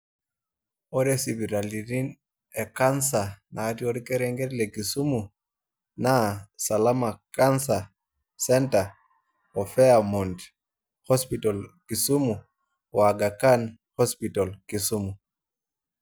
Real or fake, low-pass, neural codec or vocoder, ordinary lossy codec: real; none; none; none